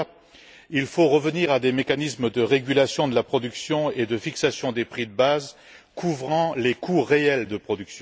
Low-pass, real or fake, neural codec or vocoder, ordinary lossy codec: none; real; none; none